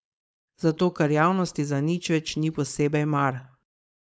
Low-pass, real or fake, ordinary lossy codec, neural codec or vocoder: none; fake; none; codec, 16 kHz, 4.8 kbps, FACodec